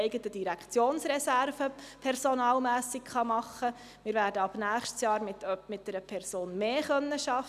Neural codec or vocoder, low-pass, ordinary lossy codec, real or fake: none; 14.4 kHz; none; real